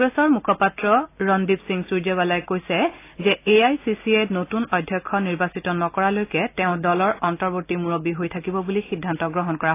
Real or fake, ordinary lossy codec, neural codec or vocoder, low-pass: real; AAC, 24 kbps; none; 3.6 kHz